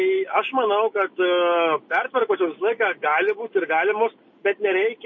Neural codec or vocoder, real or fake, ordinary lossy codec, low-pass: none; real; MP3, 32 kbps; 7.2 kHz